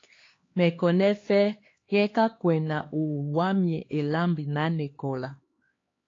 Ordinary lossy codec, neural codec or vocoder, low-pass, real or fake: AAC, 32 kbps; codec, 16 kHz, 2 kbps, X-Codec, HuBERT features, trained on LibriSpeech; 7.2 kHz; fake